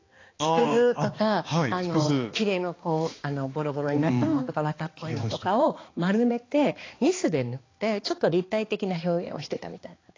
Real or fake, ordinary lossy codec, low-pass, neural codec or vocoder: fake; AAC, 32 kbps; 7.2 kHz; codec, 16 kHz, 2 kbps, X-Codec, HuBERT features, trained on balanced general audio